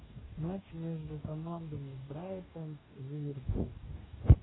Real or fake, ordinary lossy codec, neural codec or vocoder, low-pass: fake; AAC, 16 kbps; codec, 44.1 kHz, 2.6 kbps, DAC; 7.2 kHz